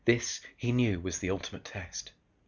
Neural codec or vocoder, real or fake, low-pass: none; real; 7.2 kHz